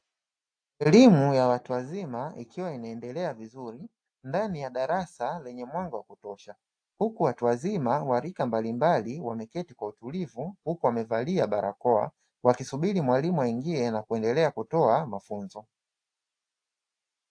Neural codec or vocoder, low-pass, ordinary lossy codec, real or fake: none; 9.9 kHz; AAC, 64 kbps; real